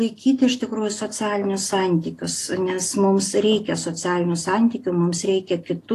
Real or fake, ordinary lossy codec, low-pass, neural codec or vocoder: real; AAC, 48 kbps; 14.4 kHz; none